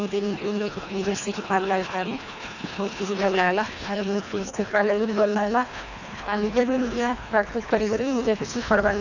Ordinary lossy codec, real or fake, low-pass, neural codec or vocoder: none; fake; 7.2 kHz; codec, 24 kHz, 1.5 kbps, HILCodec